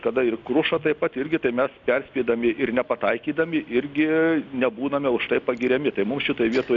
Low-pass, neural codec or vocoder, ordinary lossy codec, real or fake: 7.2 kHz; none; MP3, 96 kbps; real